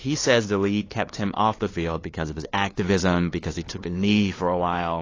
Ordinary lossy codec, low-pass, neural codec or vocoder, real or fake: AAC, 32 kbps; 7.2 kHz; codec, 16 kHz, 2 kbps, FunCodec, trained on LibriTTS, 25 frames a second; fake